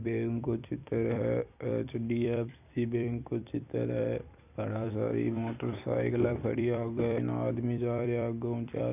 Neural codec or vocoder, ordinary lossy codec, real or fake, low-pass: vocoder, 44.1 kHz, 128 mel bands every 256 samples, BigVGAN v2; none; fake; 3.6 kHz